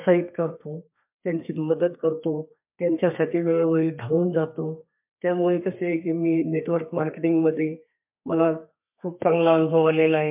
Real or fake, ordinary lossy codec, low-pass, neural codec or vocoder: fake; MP3, 32 kbps; 3.6 kHz; codec, 32 kHz, 1.9 kbps, SNAC